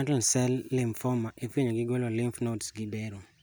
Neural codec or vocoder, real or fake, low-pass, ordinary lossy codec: none; real; none; none